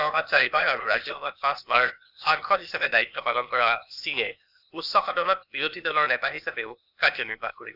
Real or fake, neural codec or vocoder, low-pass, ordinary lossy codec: fake; codec, 16 kHz, 0.8 kbps, ZipCodec; 5.4 kHz; none